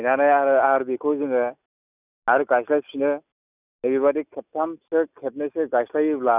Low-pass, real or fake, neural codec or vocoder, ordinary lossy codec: 3.6 kHz; real; none; none